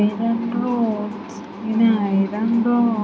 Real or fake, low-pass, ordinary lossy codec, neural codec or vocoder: real; none; none; none